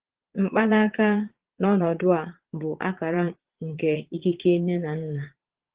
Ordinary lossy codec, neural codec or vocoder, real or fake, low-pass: Opus, 16 kbps; vocoder, 22.05 kHz, 80 mel bands, Vocos; fake; 3.6 kHz